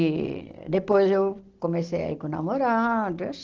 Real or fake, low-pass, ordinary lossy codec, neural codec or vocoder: real; 7.2 kHz; Opus, 16 kbps; none